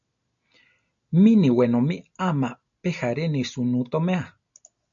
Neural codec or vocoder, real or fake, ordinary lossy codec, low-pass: none; real; MP3, 64 kbps; 7.2 kHz